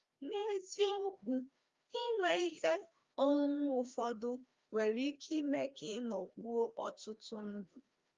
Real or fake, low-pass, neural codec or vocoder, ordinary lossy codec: fake; 7.2 kHz; codec, 16 kHz, 1 kbps, FreqCodec, larger model; Opus, 32 kbps